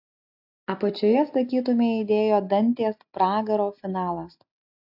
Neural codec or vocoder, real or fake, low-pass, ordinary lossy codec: none; real; 5.4 kHz; AAC, 48 kbps